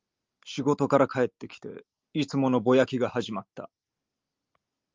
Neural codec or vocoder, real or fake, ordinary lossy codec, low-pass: none; real; Opus, 24 kbps; 7.2 kHz